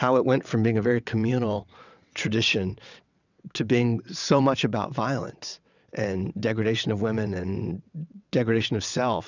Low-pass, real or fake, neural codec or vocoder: 7.2 kHz; fake; vocoder, 22.05 kHz, 80 mel bands, WaveNeXt